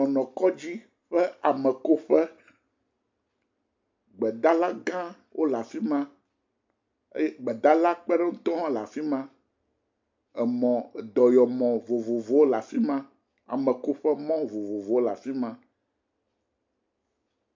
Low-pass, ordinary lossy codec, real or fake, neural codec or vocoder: 7.2 kHz; MP3, 64 kbps; real; none